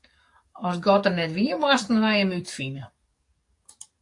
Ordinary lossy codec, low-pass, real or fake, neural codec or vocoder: AAC, 48 kbps; 10.8 kHz; fake; codec, 44.1 kHz, 7.8 kbps, DAC